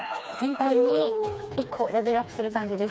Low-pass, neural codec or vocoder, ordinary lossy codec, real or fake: none; codec, 16 kHz, 2 kbps, FreqCodec, smaller model; none; fake